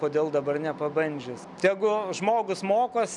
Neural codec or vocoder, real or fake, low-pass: none; real; 10.8 kHz